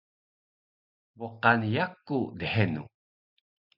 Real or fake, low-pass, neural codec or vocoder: real; 5.4 kHz; none